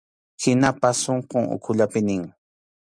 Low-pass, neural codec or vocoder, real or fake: 9.9 kHz; none; real